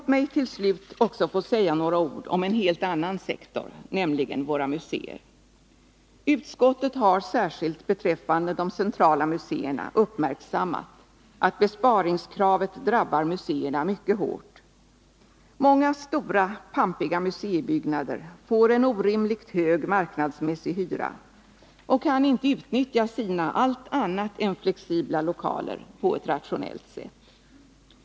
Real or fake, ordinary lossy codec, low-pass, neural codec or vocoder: real; none; none; none